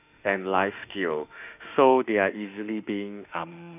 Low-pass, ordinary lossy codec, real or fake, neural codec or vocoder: 3.6 kHz; none; fake; autoencoder, 48 kHz, 32 numbers a frame, DAC-VAE, trained on Japanese speech